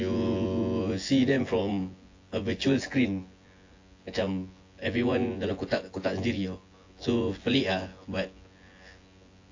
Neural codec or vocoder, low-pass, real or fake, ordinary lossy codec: vocoder, 24 kHz, 100 mel bands, Vocos; 7.2 kHz; fake; AAC, 48 kbps